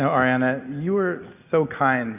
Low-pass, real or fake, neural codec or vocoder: 3.6 kHz; real; none